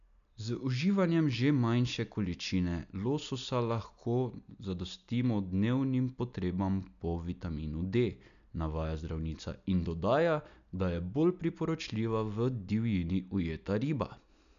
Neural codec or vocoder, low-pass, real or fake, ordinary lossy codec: none; 7.2 kHz; real; none